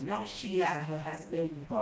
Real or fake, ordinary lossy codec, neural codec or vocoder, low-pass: fake; none; codec, 16 kHz, 1 kbps, FreqCodec, smaller model; none